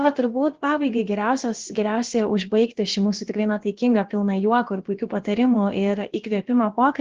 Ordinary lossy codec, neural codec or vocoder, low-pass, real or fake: Opus, 16 kbps; codec, 16 kHz, about 1 kbps, DyCAST, with the encoder's durations; 7.2 kHz; fake